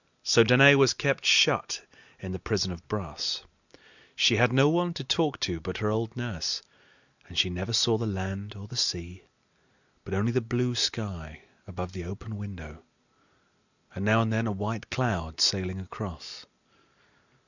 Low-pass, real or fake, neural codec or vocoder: 7.2 kHz; real; none